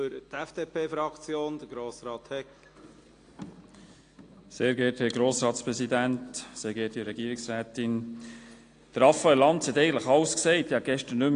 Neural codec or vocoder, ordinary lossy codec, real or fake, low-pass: none; AAC, 48 kbps; real; 9.9 kHz